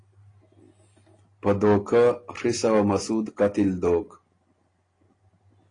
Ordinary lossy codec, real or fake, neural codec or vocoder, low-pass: AAC, 32 kbps; real; none; 9.9 kHz